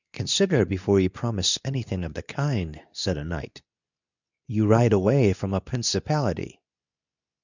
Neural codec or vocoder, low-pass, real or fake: codec, 24 kHz, 0.9 kbps, WavTokenizer, medium speech release version 2; 7.2 kHz; fake